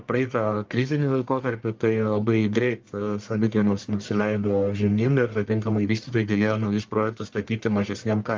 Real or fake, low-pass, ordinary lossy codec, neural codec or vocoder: fake; 7.2 kHz; Opus, 32 kbps; codec, 44.1 kHz, 1.7 kbps, Pupu-Codec